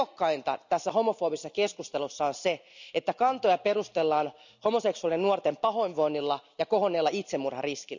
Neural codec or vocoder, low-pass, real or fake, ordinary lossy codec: none; 7.2 kHz; real; none